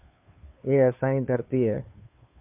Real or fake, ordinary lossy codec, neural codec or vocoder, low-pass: fake; MP3, 32 kbps; codec, 16 kHz, 2 kbps, FunCodec, trained on Chinese and English, 25 frames a second; 3.6 kHz